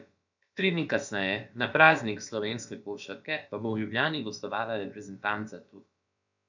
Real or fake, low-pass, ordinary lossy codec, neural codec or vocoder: fake; 7.2 kHz; none; codec, 16 kHz, about 1 kbps, DyCAST, with the encoder's durations